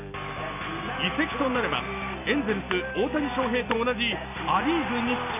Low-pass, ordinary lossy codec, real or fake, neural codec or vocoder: 3.6 kHz; none; real; none